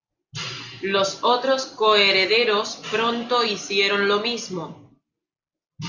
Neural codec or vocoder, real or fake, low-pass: none; real; 7.2 kHz